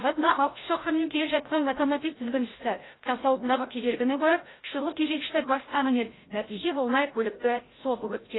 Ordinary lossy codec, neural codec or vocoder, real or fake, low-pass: AAC, 16 kbps; codec, 16 kHz, 0.5 kbps, FreqCodec, larger model; fake; 7.2 kHz